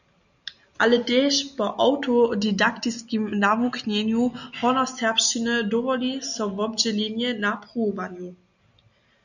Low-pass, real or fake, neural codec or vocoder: 7.2 kHz; real; none